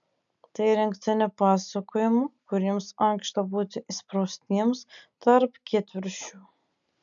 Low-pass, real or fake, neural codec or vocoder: 7.2 kHz; real; none